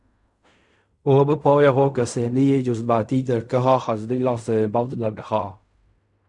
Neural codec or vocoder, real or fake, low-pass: codec, 16 kHz in and 24 kHz out, 0.4 kbps, LongCat-Audio-Codec, fine tuned four codebook decoder; fake; 10.8 kHz